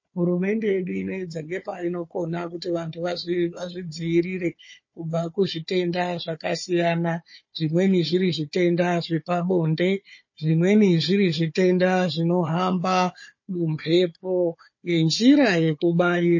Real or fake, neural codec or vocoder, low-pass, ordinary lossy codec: fake; codec, 16 kHz, 4 kbps, FunCodec, trained on Chinese and English, 50 frames a second; 7.2 kHz; MP3, 32 kbps